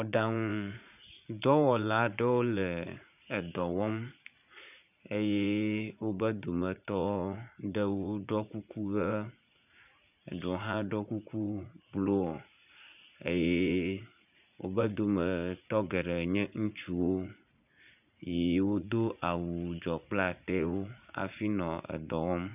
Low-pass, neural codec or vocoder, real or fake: 3.6 kHz; vocoder, 44.1 kHz, 80 mel bands, Vocos; fake